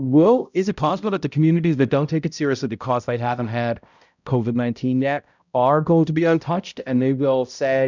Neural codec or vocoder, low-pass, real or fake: codec, 16 kHz, 0.5 kbps, X-Codec, HuBERT features, trained on balanced general audio; 7.2 kHz; fake